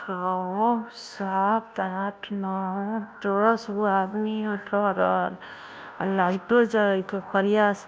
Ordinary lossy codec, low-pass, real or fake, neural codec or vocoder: none; none; fake; codec, 16 kHz, 0.5 kbps, FunCodec, trained on Chinese and English, 25 frames a second